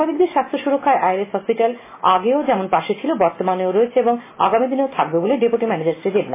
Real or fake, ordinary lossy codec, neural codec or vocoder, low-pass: real; MP3, 16 kbps; none; 3.6 kHz